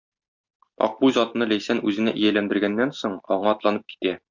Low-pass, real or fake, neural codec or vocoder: 7.2 kHz; real; none